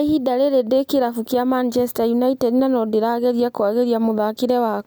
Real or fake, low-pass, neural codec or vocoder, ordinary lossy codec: real; none; none; none